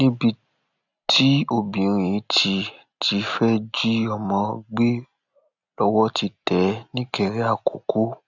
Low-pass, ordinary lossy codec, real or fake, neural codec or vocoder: 7.2 kHz; none; real; none